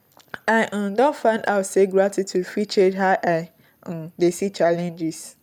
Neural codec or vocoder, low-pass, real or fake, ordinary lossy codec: none; 19.8 kHz; real; none